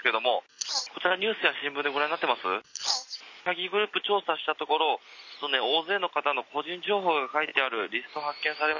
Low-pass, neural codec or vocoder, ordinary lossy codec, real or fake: 7.2 kHz; none; none; real